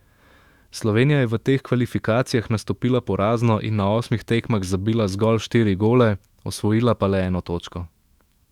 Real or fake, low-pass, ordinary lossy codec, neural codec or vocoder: fake; 19.8 kHz; Opus, 64 kbps; autoencoder, 48 kHz, 128 numbers a frame, DAC-VAE, trained on Japanese speech